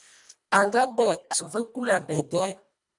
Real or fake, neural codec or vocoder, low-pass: fake; codec, 24 kHz, 1.5 kbps, HILCodec; 10.8 kHz